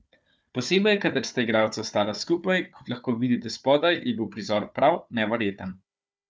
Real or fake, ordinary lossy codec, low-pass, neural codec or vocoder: fake; none; none; codec, 16 kHz, 4 kbps, FunCodec, trained on Chinese and English, 50 frames a second